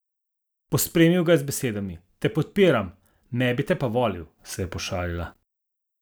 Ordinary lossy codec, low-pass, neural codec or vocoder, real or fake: none; none; none; real